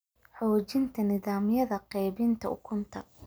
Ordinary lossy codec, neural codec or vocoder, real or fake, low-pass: none; none; real; none